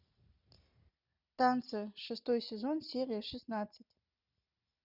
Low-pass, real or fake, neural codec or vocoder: 5.4 kHz; real; none